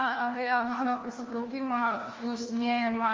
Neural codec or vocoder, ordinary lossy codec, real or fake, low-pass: codec, 16 kHz, 1 kbps, FunCodec, trained on LibriTTS, 50 frames a second; Opus, 32 kbps; fake; 7.2 kHz